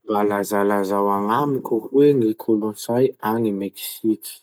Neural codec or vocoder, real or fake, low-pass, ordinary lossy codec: none; real; none; none